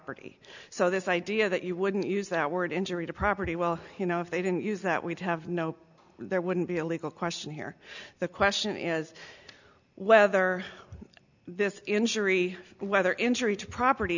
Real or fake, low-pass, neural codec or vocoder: real; 7.2 kHz; none